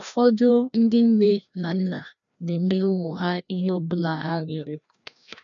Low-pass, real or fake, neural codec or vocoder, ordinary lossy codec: 7.2 kHz; fake; codec, 16 kHz, 1 kbps, FreqCodec, larger model; MP3, 96 kbps